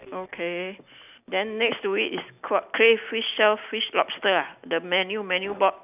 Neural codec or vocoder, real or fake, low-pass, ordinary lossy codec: none; real; 3.6 kHz; none